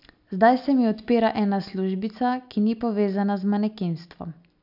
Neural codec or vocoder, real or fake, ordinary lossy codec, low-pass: none; real; none; 5.4 kHz